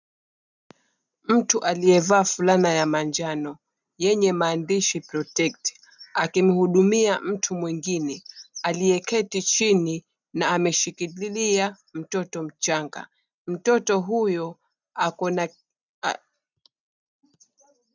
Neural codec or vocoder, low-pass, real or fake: none; 7.2 kHz; real